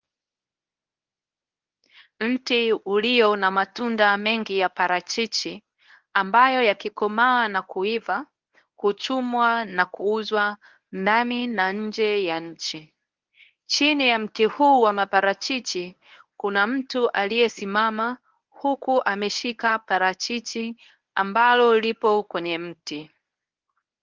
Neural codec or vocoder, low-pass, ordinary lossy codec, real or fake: codec, 24 kHz, 0.9 kbps, WavTokenizer, medium speech release version 1; 7.2 kHz; Opus, 32 kbps; fake